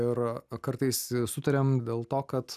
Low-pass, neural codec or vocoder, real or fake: 14.4 kHz; none; real